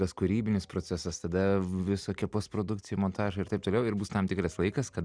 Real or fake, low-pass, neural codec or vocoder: real; 9.9 kHz; none